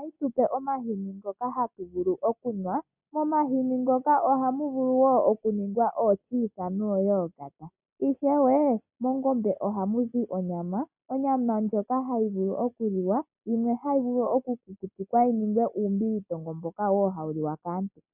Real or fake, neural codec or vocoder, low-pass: real; none; 3.6 kHz